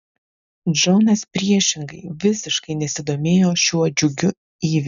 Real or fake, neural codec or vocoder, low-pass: real; none; 7.2 kHz